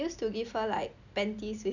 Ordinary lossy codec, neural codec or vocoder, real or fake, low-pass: none; none; real; 7.2 kHz